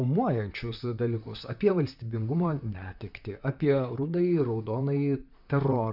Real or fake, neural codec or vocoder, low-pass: fake; vocoder, 44.1 kHz, 128 mel bands, Pupu-Vocoder; 5.4 kHz